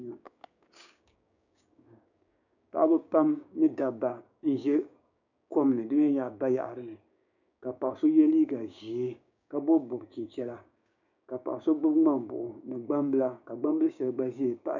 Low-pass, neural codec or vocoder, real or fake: 7.2 kHz; codec, 16 kHz, 6 kbps, DAC; fake